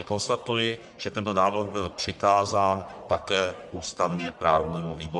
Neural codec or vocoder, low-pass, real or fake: codec, 44.1 kHz, 1.7 kbps, Pupu-Codec; 10.8 kHz; fake